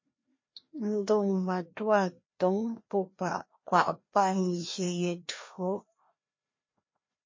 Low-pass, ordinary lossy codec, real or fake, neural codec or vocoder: 7.2 kHz; MP3, 32 kbps; fake; codec, 16 kHz, 1 kbps, FreqCodec, larger model